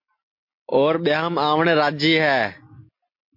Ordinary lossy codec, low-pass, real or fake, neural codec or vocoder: MP3, 32 kbps; 5.4 kHz; real; none